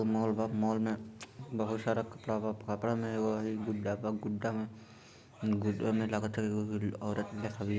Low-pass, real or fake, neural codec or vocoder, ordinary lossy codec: none; real; none; none